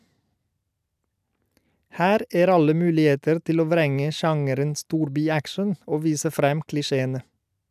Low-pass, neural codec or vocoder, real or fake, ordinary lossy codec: 14.4 kHz; none; real; none